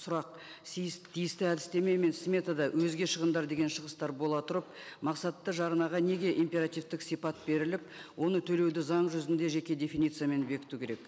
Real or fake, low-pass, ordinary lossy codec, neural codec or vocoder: real; none; none; none